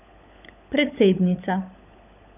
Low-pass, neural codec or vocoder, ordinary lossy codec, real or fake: 3.6 kHz; codec, 16 kHz, 16 kbps, FunCodec, trained on LibriTTS, 50 frames a second; none; fake